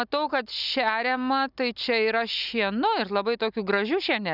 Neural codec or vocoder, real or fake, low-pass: none; real; 5.4 kHz